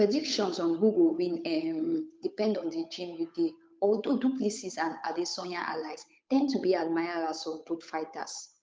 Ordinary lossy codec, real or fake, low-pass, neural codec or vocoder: none; fake; none; codec, 16 kHz, 8 kbps, FunCodec, trained on Chinese and English, 25 frames a second